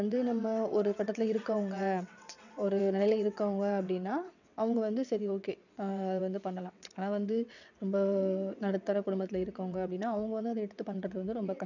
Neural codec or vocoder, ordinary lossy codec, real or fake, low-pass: vocoder, 22.05 kHz, 80 mel bands, WaveNeXt; none; fake; 7.2 kHz